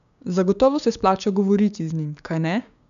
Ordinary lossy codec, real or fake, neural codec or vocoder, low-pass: none; fake; codec, 16 kHz, 6 kbps, DAC; 7.2 kHz